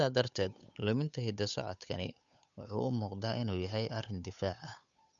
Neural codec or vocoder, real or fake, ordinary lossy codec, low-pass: codec, 16 kHz, 8 kbps, FunCodec, trained on Chinese and English, 25 frames a second; fake; none; 7.2 kHz